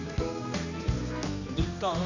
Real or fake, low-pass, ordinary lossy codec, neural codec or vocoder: fake; 7.2 kHz; none; codec, 16 kHz, 2 kbps, X-Codec, HuBERT features, trained on general audio